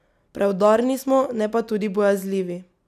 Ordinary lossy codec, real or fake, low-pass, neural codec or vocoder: none; real; 14.4 kHz; none